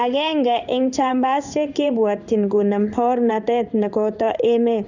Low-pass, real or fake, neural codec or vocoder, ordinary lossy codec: 7.2 kHz; fake; codec, 16 kHz in and 24 kHz out, 1 kbps, XY-Tokenizer; none